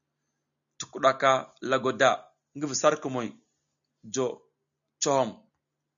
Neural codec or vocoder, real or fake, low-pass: none; real; 7.2 kHz